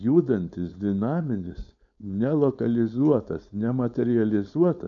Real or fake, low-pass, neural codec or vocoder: fake; 7.2 kHz; codec, 16 kHz, 4.8 kbps, FACodec